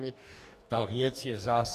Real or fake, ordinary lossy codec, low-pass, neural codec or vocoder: fake; Opus, 64 kbps; 14.4 kHz; codec, 44.1 kHz, 2.6 kbps, DAC